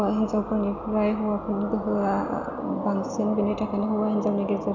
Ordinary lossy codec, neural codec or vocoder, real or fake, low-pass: none; none; real; 7.2 kHz